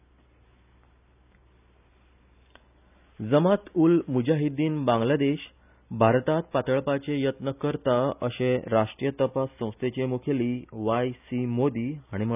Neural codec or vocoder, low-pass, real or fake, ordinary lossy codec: none; 3.6 kHz; real; none